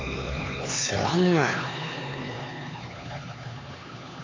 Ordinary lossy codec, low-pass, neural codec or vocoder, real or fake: AAC, 32 kbps; 7.2 kHz; codec, 16 kHz, 4 kbps, X-Codec, HuBERT features, trained on LibriSpeech; fake